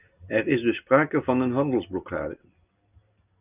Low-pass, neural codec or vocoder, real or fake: 3.6 kHz; none; real